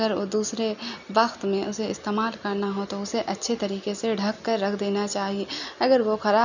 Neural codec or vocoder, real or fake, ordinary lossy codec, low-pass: none; real; none; 7.2 kHz